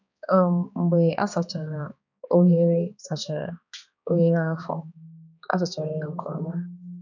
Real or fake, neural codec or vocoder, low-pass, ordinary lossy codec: fake; codec, 16 kHz, 2 kbps, X-Codec, HuBERT features, trained on balanced general audio; 7.2 kHz; none